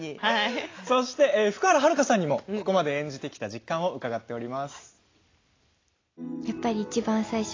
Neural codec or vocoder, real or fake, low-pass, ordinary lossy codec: none; real; 7.2 kHz; AAC, 32 kbps